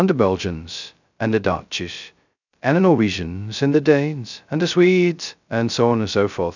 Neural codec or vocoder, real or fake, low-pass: codec, 16 kHz, 0.2 kbps, FocalCodec; fake; 7.2 kHz